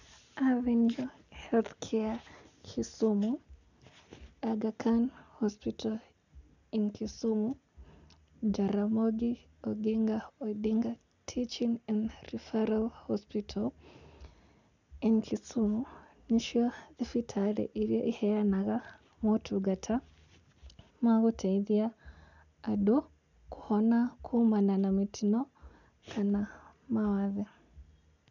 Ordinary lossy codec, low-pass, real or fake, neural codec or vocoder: none; 7.2 kHz; real; none